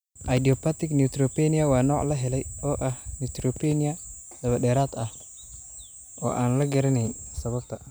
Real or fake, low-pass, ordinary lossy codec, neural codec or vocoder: real; none; none; none